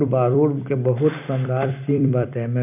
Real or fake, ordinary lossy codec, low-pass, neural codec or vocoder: fake; none; 3.6 kHz; vocoder, 44.1 kHz, 128 mel bands every 256 samples, BigVGAN v2